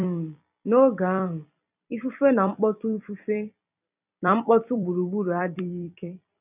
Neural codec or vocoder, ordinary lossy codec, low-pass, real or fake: vocoder, 44.1 kHz, 128 mel bands every 256 samples, BigVGAN v2; none; 3.6 kHz; fake